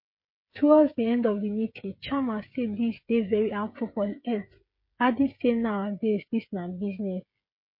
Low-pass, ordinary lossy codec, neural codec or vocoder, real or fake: 5.4 kHz; MP3, 32 kbps; codec, 16 kHz, 16 kbps, FreqCodec, smaller model; fake